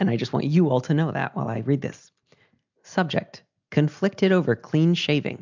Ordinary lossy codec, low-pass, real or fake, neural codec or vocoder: MP3, 64 kbps; 7.2 kHz; real; none